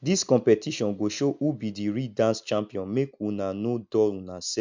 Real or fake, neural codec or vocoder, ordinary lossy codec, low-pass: real; none; MP3, 64 kbps; 7.2 kHz